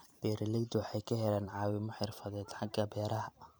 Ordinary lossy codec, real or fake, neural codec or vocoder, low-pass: none; fake; vocoder, 44.1 kHz, 128 mel bands every 512 samples, BigVGAN v2; none